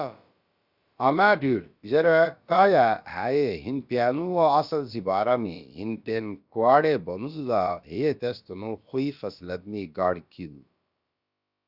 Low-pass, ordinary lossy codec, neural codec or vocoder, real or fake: 5.4 kHz; Opus, 64 kbps; codec, 16 kHz, about 1 kbps, DyCAST, with the encoder's durations; fake